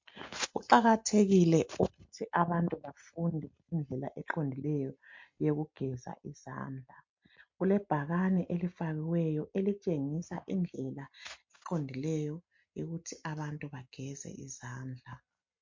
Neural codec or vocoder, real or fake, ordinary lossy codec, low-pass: none; real; MP3, 48 kbps; 7.2 kHz